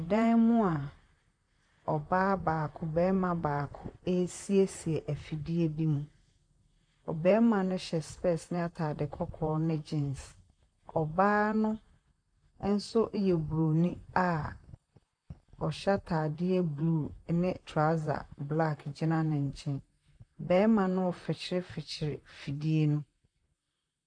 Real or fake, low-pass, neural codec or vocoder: fake; 9.9 kHz; vocoder, 44.1 kHz, 128 mel bands, Pupu-Vocoder